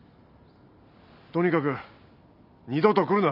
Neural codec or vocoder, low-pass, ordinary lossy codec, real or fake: none; 5.4 kHz; none; real